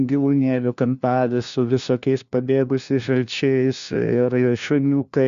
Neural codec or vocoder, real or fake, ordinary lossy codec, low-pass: codec, 16 kHz, 1 kbps, FunCodec, trained on LibriTTS, 50 frames a second; fake; Opus, 64 kbps; 7.2 kHz